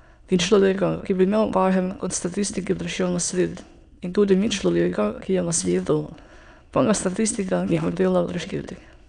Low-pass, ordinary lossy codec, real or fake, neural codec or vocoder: 9.9 kHz; none; fake; autoencoder, 22.05 kHz, a latent of 192 numbers a frame, VITS, trained on many speakers